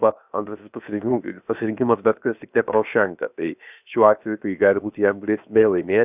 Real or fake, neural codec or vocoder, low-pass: fake; codec, 16 kHz, 0.7 kbps, FocalCodec; 3.6 kHz